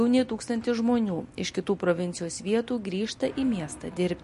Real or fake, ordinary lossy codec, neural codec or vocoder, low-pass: real; MP3, 48 kbps; none; 14.4 kHz